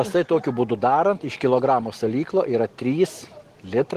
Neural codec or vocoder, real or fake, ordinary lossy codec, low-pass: none; real; Opus, 16 kbps; 14.4 kHz